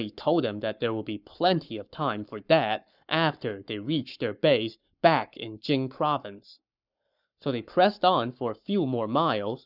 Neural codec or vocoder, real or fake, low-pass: codec, 44.1 kHz, 7.8 kbps, Pupu-Codec; fake; 5.4 kHz